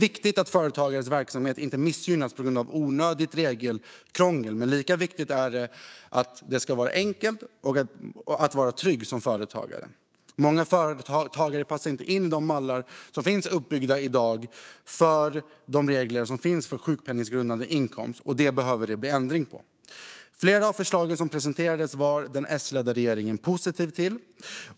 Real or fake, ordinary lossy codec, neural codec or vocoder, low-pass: fake; none; codec, 16 kHz, 6 kbps, DAC; none